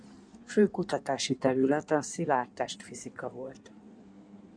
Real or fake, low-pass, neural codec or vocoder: fake; 9.9 kHz; codec, 16 kHz in and 24 kHz out, 1.1 kbps, FireRedTTS-2 codec